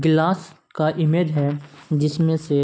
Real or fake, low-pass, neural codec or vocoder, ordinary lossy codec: real; none; none; none